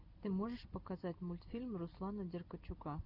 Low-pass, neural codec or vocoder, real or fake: 5.4 kHz; none; real